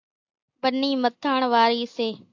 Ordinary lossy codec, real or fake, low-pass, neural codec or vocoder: AAC, 48 kbps; real; 7.2 kHz; none